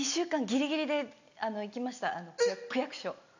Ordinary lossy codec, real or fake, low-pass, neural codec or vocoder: none; real; 7.2 kHz; none